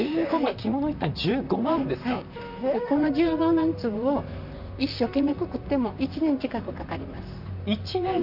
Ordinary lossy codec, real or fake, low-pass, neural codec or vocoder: none; fake; 5.4 kHz; vocoder, 44.1 kHz, 128 mel bands, Pupu-Vocoder